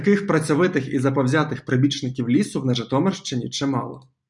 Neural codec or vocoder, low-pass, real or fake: none; 10.8 kHz; real